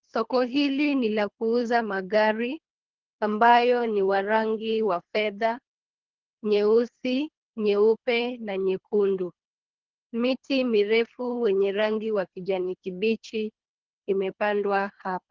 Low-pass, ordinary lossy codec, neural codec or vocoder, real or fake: 7.2 kHz; Opus, 16 kbps; codec, 24 kHz, 3 kbps, HILCodec; fake